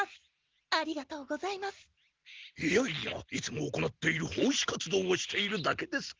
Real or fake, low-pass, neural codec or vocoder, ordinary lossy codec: real; 7.2 kHz; none; Opus, 16 kbps